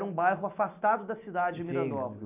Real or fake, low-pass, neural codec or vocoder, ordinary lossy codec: real; 3.6 kHz; none; none